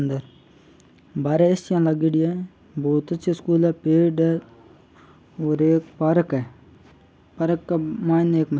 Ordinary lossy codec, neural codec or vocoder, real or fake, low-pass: none; none; real; none